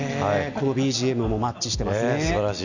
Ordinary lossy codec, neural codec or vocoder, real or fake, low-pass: none; none; real; 7.2 kHz